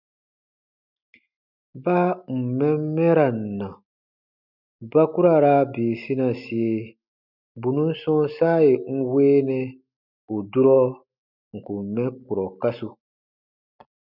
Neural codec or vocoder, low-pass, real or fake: none; 5.4 kHz; real